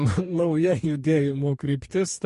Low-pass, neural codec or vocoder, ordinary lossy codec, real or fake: 14.4 kHz; codec, 44.1 kHz, 2.6 kbps, DAC; MP3, 48 kbps; fake